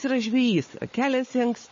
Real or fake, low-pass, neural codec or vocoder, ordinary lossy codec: fake; 7.2 kHz; codec, 16 kHz, 4 kbps, FunCodec, trained on Chinese and English, 50 frames a second; MP3, 32 kbps